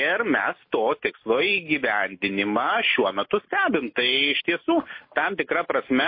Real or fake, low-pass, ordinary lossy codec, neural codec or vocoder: real; 5.4 kHz; MP3, 24 kbps; none